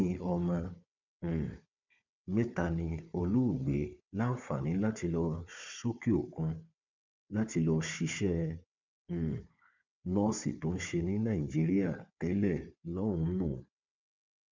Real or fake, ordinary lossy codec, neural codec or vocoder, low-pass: fake; MP3, 64 kbps; codec, 16 kHz, 4 kbps, FunCodec, trained on Chinese and English, 50 frames a second; 7.2 kHz